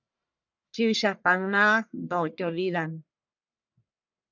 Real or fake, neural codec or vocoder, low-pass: fake; codec, 44.1 kHz, 1.7 kbps, Pupu-Codec; 7.2 kHz